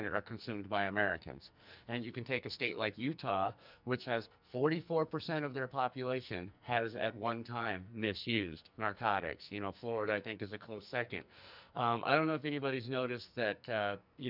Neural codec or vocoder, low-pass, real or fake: codec, 44.1 kHz, 2.6 kbps, SNAC; 5.4 kHz; fake